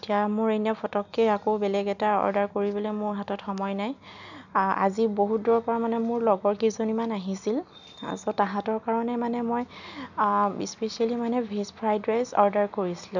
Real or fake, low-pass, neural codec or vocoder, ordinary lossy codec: real; 7.2 kHz; none; none